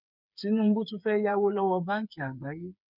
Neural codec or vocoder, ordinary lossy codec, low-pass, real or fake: codec, 16 kHz, 8 kbps, FreqCodec, smaller model; none; 5.4 kHz; fake